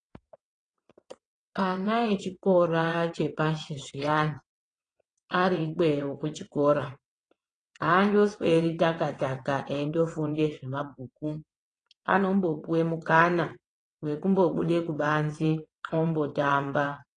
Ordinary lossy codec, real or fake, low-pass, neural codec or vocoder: AAC, 32 kbps; fake; 9.9 kHz; vocoder, 22.05 kHz, 80 mel bands, WaveNeXt